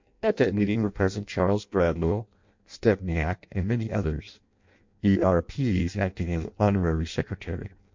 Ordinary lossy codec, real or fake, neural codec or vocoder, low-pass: MP3, 48 kbps; fake; codec, 16 kHz in and 24 kHz out, 0.6 kbps, FireRedTTS-2 codec; 7.2 kHz